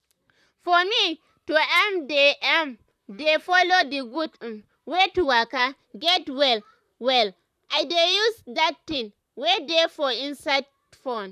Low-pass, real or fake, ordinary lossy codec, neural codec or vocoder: 14.4 kHz; fake; none; vocoder, 44.1 kHz, 128 mel bands, Pupu-Vocoder